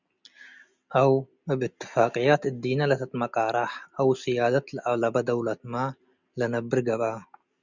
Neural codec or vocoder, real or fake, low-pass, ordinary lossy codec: none; real; 7.2 kHz; Opus, 64 kbps